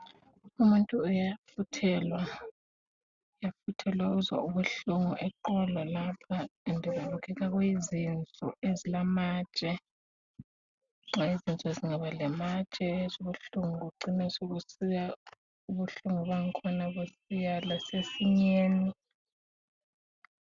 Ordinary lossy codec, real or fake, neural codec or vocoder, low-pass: Opus, 64 kbps; real; none; 7.2 kHz